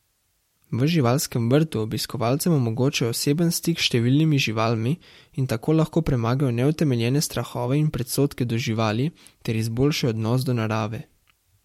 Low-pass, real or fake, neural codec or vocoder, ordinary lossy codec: 19.8 kHz; real; none; MP3, 64 kbps